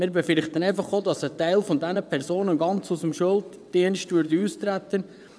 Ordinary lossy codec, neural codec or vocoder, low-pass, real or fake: none; vocoder, 22.05 kHz, 80 mel bands, WaveNeXt; none; fake